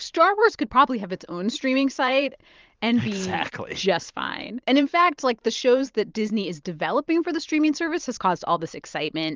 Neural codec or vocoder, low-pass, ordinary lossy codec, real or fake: vocoder, 44.1 kHz, 128 mel bands every 512 samples, BigVGAN v2; 7.2 kHz; Opus, 32 kbps; fake